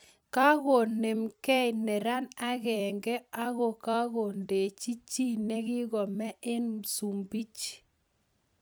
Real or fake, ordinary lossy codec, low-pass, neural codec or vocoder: fake; none; none; vocoder, 44.1 kHz, 128 mel bands every 256 samples, BigVGAN v2